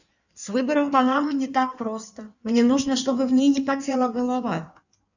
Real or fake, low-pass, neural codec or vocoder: fake; 7.2 kHz; codec, 16 kHz in and 24 kHz out, 1.1 kbps, FireRedTTS-2 codec